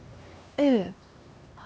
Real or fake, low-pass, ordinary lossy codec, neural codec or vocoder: fake; none; none; codec, 16 kHz, 2 kbps, X-Codec, HuBERT features, trained on LibriSpeech